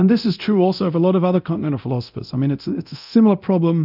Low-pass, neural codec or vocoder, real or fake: 5.4 kHz; codec, 24 kHz, 0.9 kbps, DualCodec; fake